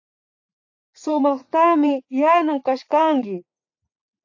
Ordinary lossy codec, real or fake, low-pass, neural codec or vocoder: AAC, 48 kbps; fake; 7.2 kHz; vocoder, 44.1 kHz, 80 mel bands, Vocos